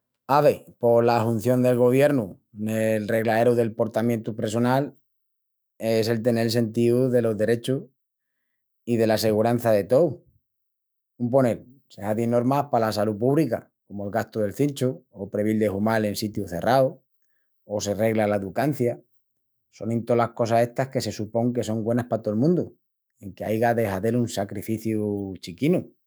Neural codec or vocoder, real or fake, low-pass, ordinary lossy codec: autoencoder, 48 kHz, 128 numbers a frame, DAC-VAE, trained on Japanese speech; fake; none; none